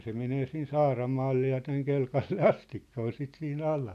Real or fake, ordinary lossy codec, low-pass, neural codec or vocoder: real; none; 14.4 kHz; none